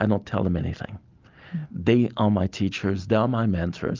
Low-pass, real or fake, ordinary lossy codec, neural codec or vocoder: 7.2 kHz; real; Opus, 24 kbps; none